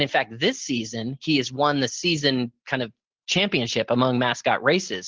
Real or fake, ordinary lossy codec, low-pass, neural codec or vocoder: real; Opus, 16 kbps; 7.2 kHz; none